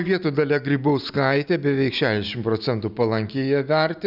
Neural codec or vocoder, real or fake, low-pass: none; real; 5.4 kHz